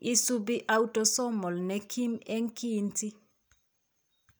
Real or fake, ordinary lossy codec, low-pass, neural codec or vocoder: real; none; none; none